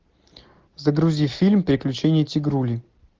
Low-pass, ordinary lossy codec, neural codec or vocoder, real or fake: 7.2 kHz; Opus, 16 kbps; none; real